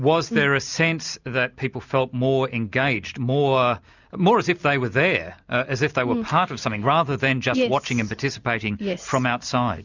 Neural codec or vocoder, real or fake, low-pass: none; real; 7.2 kHz